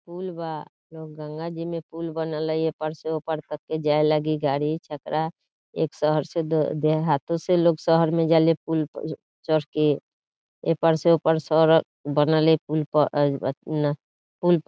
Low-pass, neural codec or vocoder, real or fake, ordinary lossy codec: none; none; real; none